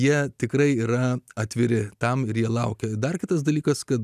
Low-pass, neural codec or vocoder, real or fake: 14.4 kHz; none; real